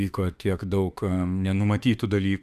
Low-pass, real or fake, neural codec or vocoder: 14.4 kHz; fake; autoencoder, 48 kHz, 32 numbers a frame, DAC-VAE, trained on Japanese speech